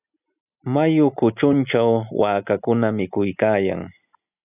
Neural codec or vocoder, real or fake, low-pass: none; real; 3.6 kHz